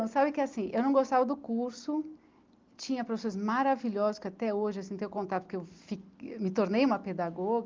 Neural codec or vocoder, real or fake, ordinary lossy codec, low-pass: none; real; Opus, 24 kbps; 7.2 kHz